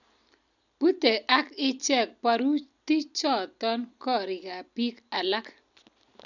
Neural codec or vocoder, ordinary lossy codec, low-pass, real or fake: none; none; 7.2 kHz; real